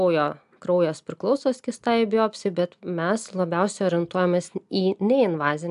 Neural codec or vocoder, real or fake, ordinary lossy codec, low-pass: none; real; AAC, 96 kbps; 10.8 kHz